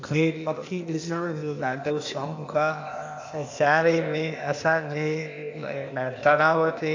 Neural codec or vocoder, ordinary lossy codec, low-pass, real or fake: codec, 16 kHz, 0.8 kbps, ZipCodec; AAC, 48 kbps; 7.2 kHz; fake